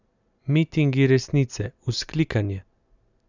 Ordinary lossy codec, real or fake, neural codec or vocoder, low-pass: none; real; none; 7.2 kHz